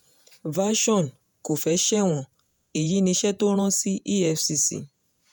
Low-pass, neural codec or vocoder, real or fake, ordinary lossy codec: none; vocoder, 48 kHz, 128 mel bands, Vocos; fake; none